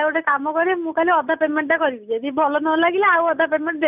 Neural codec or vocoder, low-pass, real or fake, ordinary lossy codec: none; 3.6 kHz; real; none